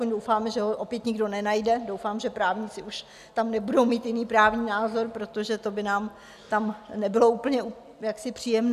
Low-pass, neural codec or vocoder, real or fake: 14.4 kHz; none; real